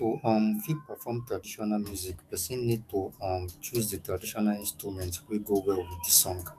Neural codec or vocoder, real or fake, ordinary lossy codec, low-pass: codec, 44.1 kHz, 7.8 kbps, DAC; fake; none; 14.4 kHz